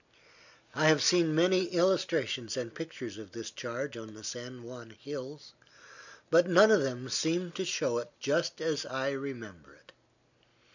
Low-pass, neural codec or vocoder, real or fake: 7.2 kHz; none; real